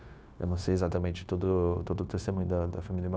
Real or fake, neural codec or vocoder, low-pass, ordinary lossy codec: fake; codec, 16 kHz, 0.9 kbps, LongCat-Audio-Codec; none; none